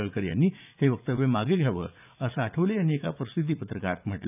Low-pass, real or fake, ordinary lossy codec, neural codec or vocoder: 3.6 kHz; fake; none; vocoder, 44.1 kHz, 80 mel bands, Vocos